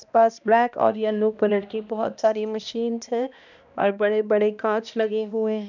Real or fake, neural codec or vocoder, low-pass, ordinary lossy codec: fake; codec, 16 kHz, 1 kbps, X-Codec, HuBERT features, trained on balanced general audio; 7.2 kHz; none